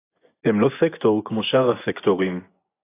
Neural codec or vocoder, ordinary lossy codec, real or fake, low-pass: vocoder, 24 kHz, 100 mel bands, Vocos; AAC, 16 kbps; fake; 3.6 kHz